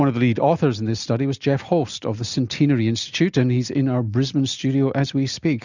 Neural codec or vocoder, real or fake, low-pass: none; real; 7.2 kHz